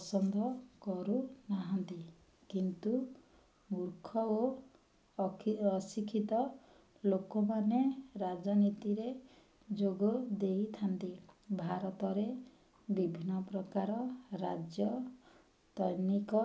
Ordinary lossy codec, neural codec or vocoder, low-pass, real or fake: none; none; none; real